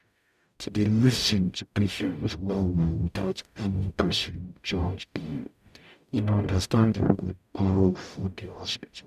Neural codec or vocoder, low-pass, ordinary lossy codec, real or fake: codec, 44.1 kHz, 0.9 kbps, DAC; 14.4 kHz; none; fake